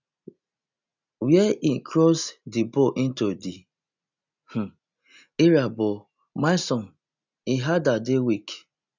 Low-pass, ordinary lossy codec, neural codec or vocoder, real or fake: 7.2 kHz; none; none; real